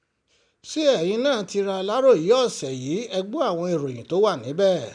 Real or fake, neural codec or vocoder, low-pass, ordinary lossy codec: real; none; 9.9 kHz; none